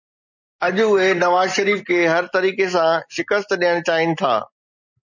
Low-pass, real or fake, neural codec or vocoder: 7.2 kHz; real; none